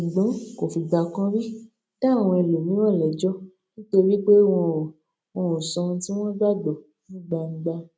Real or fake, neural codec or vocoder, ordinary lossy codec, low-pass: real; none; none; none